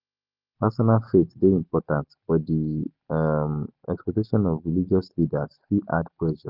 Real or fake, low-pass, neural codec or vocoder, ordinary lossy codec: real; 5.4 kHz; none; Opus, 32 kbps